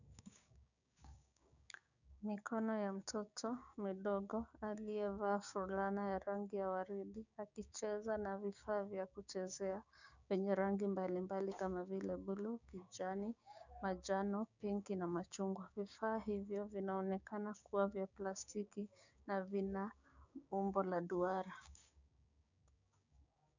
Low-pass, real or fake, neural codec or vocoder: 7.2 kHz; fake; codec, 16 kHz, 6 kbps, DAC